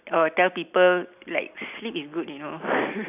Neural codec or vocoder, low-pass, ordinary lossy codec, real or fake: none; 3.6 kHz; none; real